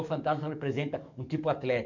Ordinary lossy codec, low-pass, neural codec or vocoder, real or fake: none; 7.2 kHz; codec, 44.1 kHz, 7.8 kbps, DAC; fake